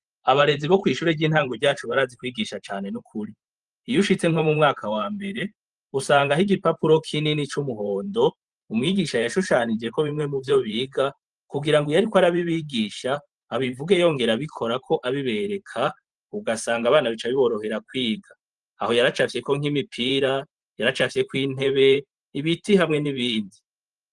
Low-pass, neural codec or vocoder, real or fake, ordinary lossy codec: 10.8 kHz; vocoder, 44.1 kHz, 128 mel bands every 512 samples, BigVGAN v2; fake; Opus, 24 kbps